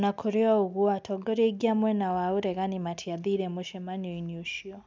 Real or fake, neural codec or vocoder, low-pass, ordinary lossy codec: real; none; none; none